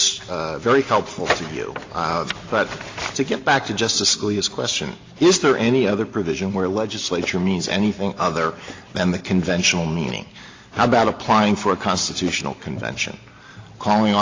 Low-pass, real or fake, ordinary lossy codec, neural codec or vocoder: 7.2 kHz; real; AAC, 32 kbps; none